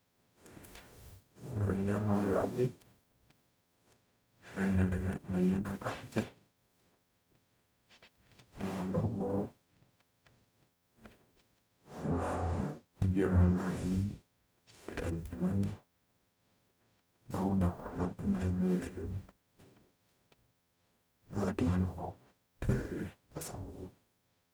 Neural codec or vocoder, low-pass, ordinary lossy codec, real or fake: codec, 44.1 kHz, 0.9 kbps, DAC; none; none; fake